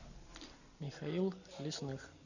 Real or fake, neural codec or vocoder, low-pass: real; none; 7.2 kHz